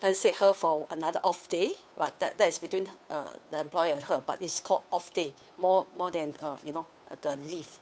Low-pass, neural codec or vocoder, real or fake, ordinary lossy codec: none; codec, 16 kHz, 2 kbps, FunCodec, trained on Chinese and English, 25 frames a second; fake; none